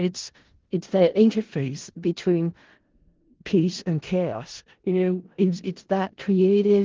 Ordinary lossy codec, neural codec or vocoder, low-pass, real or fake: Opus, 16 kbps; codec, 16 kHz in and 24 kHz out, 0.4 kbps, LongCat-Audio-Codec, four codebook decoder; 7.2 kHz; fake